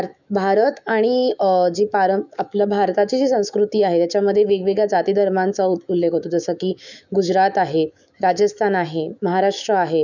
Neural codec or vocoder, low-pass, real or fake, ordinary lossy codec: autoencoder, 48 kHz, 128 numbers a frame, DAC-VAE, trained on Japanese speech; 7.2 kHz; fake; none